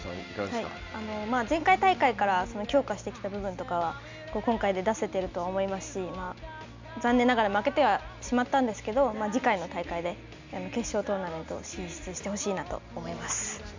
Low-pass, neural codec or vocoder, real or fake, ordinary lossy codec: 7.2 kHz; none; real; MP3, 64 kbps